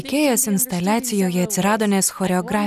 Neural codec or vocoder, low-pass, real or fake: none; 14.4 kHz; real